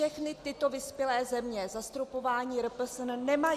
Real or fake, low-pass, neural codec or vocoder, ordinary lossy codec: real; 14.4 kHz; none; Opus, 64 kbps